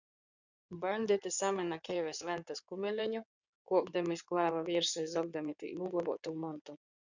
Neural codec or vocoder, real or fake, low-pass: codec, 16 kHz in and 24 kHz out, 2.2 kbps, FireRedTTS-2 codec; fake; 7.2 kHz